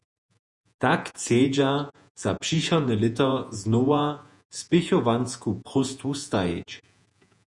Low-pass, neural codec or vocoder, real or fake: 10.8 kHz; vocoder, 48 kHz, 128 mel bands, Vocos; fake